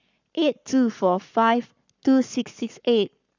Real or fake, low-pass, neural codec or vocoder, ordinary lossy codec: fake; 7.2 kHz; codec, 44.1 kHz, 7.8 kbps, Pupu-Codec; none